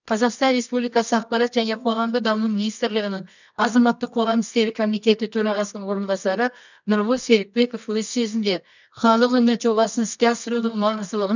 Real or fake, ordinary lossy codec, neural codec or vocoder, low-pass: fake; none; codec, 24 kHz, 0.9 kbps, WavTokenizer, medium music audio release; 7.2 kHz